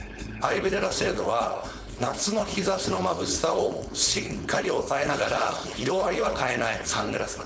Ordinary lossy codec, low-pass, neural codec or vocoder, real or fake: none; none; codec, 16 kHz, 4.8 kbps, FACodec; fake